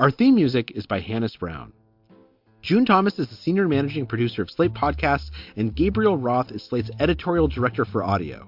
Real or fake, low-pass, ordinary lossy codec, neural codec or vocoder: real; 5.4 kHz; MP3, 48 kbps; none